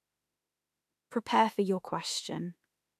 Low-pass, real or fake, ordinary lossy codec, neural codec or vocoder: 14.4 kHz; fake; none; autoencoder, 48 kHz, 32 numbers a frame, DAC-VAE, trained on Japanese speech